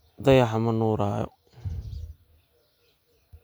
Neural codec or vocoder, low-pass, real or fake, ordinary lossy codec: none; none; real; none